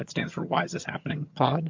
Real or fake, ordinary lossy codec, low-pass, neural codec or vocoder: fake; MP3, 48 kbps; 7.2 kHz; vocoder, 22.05 kHz, 80 mel bands, HiFi-GAN